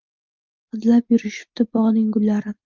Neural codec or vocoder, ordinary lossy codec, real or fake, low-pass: none; Opus, 32 kbps; real; 7.2 kHz